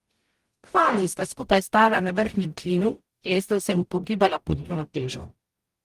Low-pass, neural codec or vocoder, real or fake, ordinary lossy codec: 14.4 kHz; codec, 44.1 kHz, 0.9 kbps, DAC; fake; Opus, 24 kbps